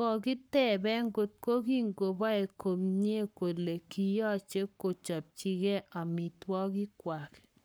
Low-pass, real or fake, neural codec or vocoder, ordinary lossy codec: none; fake; codec, 44.1 kHz, 7.8 kbps, Pupu-Codec; none